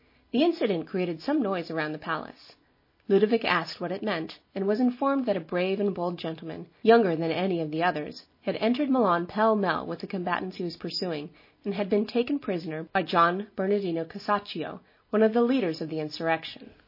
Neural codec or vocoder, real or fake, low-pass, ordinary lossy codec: none; real; 5.4 kHz; MP3, 24 kbps